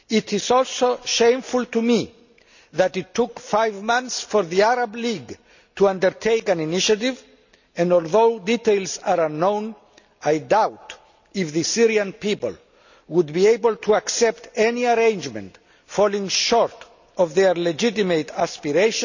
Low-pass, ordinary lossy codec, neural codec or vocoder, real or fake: 7.2 kHz; none; none; real